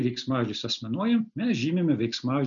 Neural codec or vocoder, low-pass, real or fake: none; 7.2 kHz; real